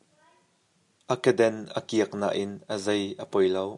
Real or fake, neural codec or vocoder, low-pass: real; none; 10.8 kHz